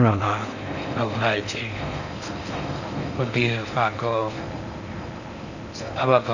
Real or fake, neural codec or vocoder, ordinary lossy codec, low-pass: fake; codec, 16 kHz in and 24 kHz out, 0.6 kbps, FocalCodec, streaming, 4096 codes; none; 7.2 kHz